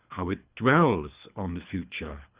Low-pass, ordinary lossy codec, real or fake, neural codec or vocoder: 3.6 kHz; Opus, 64 kbps; fake; codec, 24 kHz, 3 kbps, HILCodec